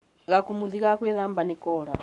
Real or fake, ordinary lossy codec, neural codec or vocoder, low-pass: fake; none; codec, 44.1 kHz, 7.8 kbps, Pupu-Codec; 10.8 kHz